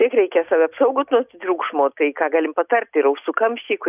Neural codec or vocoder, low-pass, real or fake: none; 3.6 kHz; real